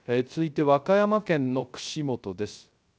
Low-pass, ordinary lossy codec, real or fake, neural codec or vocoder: none; none; fake; codec, 16 kHz, 0.3 kbps, FocalCodec